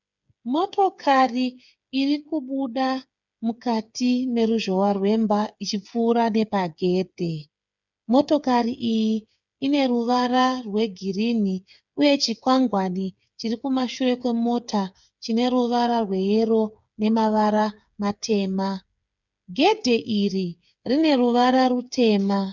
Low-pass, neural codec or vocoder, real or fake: 7.2 kHz; codec, 16 kHz, 8 kbps, FreqCodec, smaller model; fake